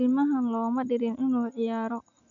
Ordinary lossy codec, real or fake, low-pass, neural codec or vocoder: none; real; 7.2 kHz; none